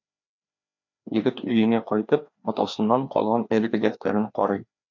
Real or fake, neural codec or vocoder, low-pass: fake; codec, 16 kHz, 2 kbps, FreqCodec, larger model; 7.2 kHz